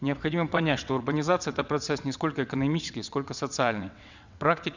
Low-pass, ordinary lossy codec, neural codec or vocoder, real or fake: 7.2 kHz; none; vocoder, 22.05 kHz, 80 mel bands, WaveNeXt; fake